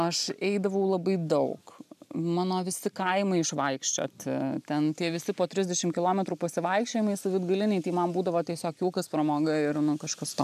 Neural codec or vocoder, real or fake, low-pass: none; real; 14.4 kHz